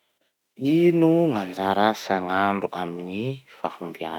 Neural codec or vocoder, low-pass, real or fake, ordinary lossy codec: autoencoder, 48 kHz, 32 numbers a frame, DAC-VAE, trained on Japanese speech; 19.8 kHz; fake; none